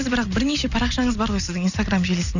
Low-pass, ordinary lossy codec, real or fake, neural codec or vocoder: 7.2 kHz; none; real; none